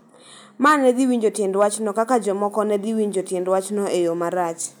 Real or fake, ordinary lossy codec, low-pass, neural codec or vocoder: real; none; none; none